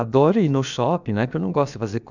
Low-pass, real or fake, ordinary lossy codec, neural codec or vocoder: 7.2 kHz; fake; none; codec, 16 kHz, about 1 kbps, DyCAST, with the encoder's durations